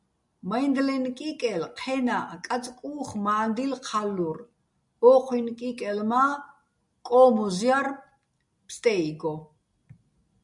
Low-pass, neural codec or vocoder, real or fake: 10.8 kHz; none; real